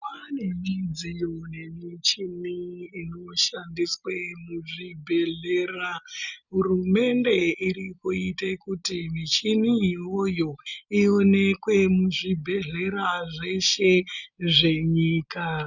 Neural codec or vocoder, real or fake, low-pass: none; real; 7.2 kHz